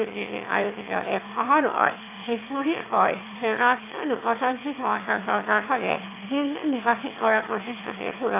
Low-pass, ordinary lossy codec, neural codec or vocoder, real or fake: 3.6 kHz; AAC, 24 kbps; autoencoder, 22.05 kHz, a latent of 192 numbers a frame, VITS, trained on one speaker; fake